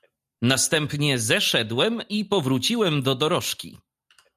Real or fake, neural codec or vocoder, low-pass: real; none; 14.4 kHz